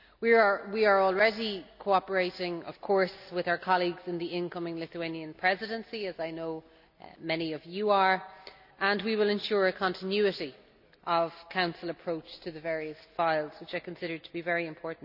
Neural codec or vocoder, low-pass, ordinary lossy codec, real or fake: none; 5.4 kHz; none; real